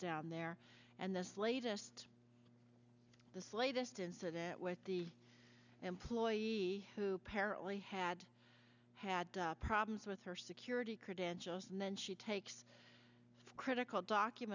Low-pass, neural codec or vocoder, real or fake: 7.2 kHz; none; real